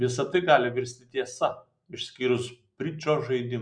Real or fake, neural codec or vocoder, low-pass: real; none; 9.9 kHz